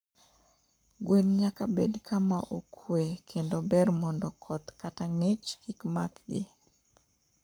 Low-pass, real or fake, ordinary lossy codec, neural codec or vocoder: none; fake; none; codec, 44.1 kHz, 7.8 kbps, Pupu-Codec